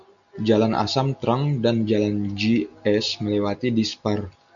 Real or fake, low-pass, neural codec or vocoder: real; 7.2 kHz; none